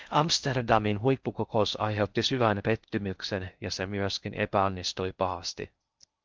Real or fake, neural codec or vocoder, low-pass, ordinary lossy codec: fake; codec, 16 kHz in and 24 kHz out, 0.6 kbps, FocalCodec, streaming, 4096 codes; 7.2 kHz; Opus, 24 kbps